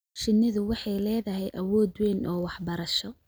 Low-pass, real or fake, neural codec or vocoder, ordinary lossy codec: none; real; none; none